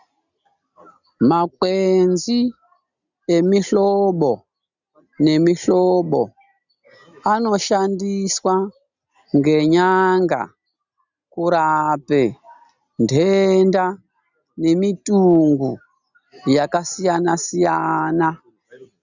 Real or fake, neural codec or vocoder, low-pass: real; none; 7.2 kHz